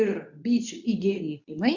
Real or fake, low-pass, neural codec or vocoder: fake; 7.2 kHz; codec, 24 kHz, 0.9 kbps, WavTokenizer, medium speech release version 2